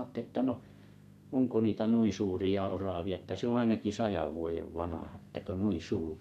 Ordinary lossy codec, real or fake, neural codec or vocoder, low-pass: none; fake; codec, 32 kHz, 1.9 kbps, SNAC; 14.4 kHz